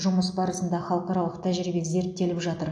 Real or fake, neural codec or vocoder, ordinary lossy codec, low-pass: real; none; AAC, 64 kbps; 9.9 kHz